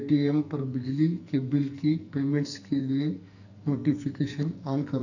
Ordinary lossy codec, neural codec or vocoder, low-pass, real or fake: none; codec, 44.1 kHz, 2.6 kbps, SNAC; 7.2 kHz; fake